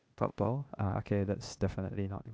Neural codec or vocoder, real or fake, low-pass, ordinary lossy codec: codec, 16 kHz, 0.8 kbps, ZipCodec; fake; none; none